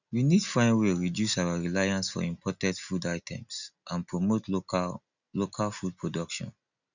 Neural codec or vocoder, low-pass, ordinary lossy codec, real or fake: none; 7.2 kHz; none; real